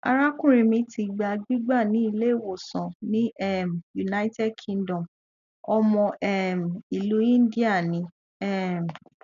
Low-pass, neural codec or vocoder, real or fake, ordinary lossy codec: 7.2 kHz; none; real; none